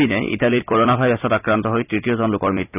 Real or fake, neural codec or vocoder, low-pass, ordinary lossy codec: fake; vocoder, 44.1 kHz, 128 mel bands every 256 samples, BigVGAN v2; 3.6 kHz; none